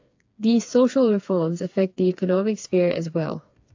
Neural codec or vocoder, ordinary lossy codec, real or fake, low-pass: codec, 16 kHz, 4 kbps, FreqCodec, smaller model; AAC, 48 kbps; fake; 7.2 kHz